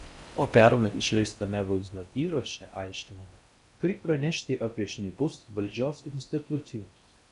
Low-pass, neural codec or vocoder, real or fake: 10.8 kHz; codec, 16 kHz in and 24 kHz out, 0.6 kbps, FocalCodec, streaming, 4096 codes; fake